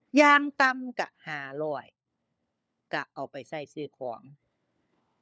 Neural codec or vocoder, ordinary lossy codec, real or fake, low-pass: codec, 16 kHz, 4 kbps, FunCodec, trained on LibriTTS, 50 frames a second; none; fake; none